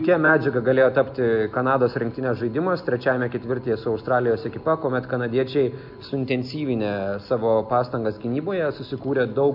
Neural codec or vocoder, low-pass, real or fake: none; 5.4 kHz; real